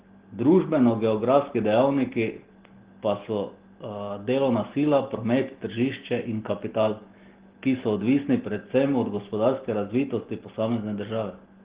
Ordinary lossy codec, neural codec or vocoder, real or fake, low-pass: Opus, 16 kbps; none; real; 3.6 kHz